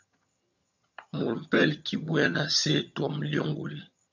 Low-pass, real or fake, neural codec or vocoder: 7.2 kHz; fake; vocoder, 22.05 kHz, 80 mel bands, HiFi-GAN